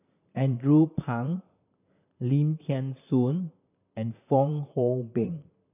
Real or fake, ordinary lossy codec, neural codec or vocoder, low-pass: fake; none; vocoder, 44.1 kHz, 128 mel bands, Pupu-Vocoder; 3.6 kHz